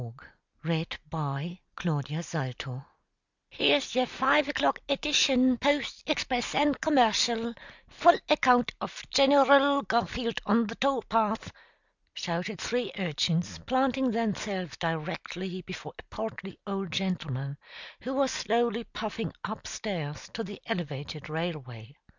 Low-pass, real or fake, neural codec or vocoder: 7.2 kHz; real; none